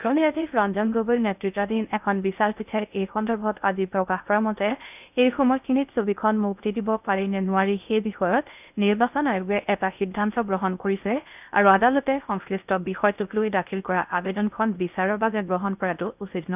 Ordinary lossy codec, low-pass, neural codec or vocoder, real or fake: none; 3.6 kHz; codec, 16 kHz in and 24 kHz out, 0.6 kbps, FocalCodec, streaming, 2048 codes; fake